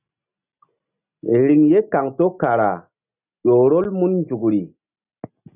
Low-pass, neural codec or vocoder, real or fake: 3.6 kHz; none; real